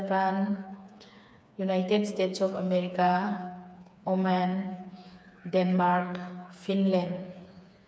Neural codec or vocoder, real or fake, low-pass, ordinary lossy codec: codec, 16 kHz, 4 kbps, FreqCodec, smaller model; fake; none; none